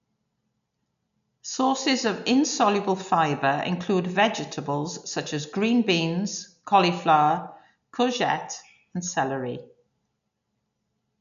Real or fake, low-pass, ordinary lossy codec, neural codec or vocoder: real; 7.2 kHz; none; none